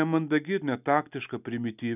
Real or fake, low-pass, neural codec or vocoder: real; 3.6 kHz; none